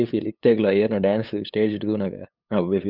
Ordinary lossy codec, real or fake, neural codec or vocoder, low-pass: none; fake; codec, 16 kHz, 8 kbps, FunCodec, trained on LibriTTS, 25 frames a second; 5.4 kHz